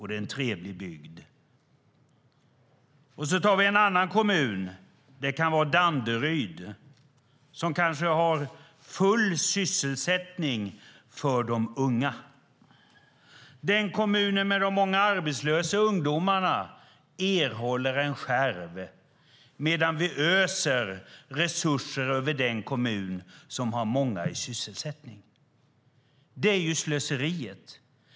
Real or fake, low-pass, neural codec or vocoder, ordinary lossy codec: real; none; none; none